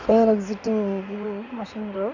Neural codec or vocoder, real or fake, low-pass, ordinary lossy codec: codec, 16 kHz in and 24 kHz out, 2.2 kbps, FireRedTTS-2 codec; fake; 7.2 kHz; none